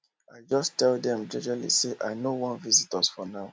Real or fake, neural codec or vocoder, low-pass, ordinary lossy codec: real; none; none; none